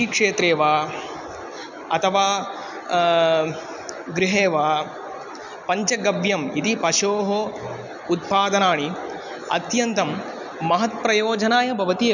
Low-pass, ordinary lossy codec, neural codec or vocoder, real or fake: 7.2 kHz; none; none; real